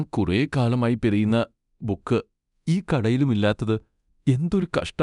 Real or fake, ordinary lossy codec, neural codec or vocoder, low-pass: fake; none; codec, 24 kHz, 0.9 kbps, DualCodec; 10.8 kHz